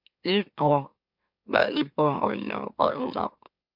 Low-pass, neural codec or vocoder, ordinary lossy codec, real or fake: 5.4 kHz; autoencoder, 44.1 kHz, a latent of 192 numbers a frame, MeloTTS; MP3, 48 kbps; fake